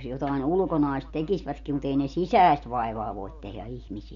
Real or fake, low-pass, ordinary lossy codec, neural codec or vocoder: real; 7.2 kHz; MP3, 48 kbps; none